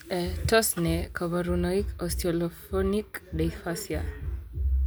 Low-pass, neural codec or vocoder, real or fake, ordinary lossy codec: none; none; real; none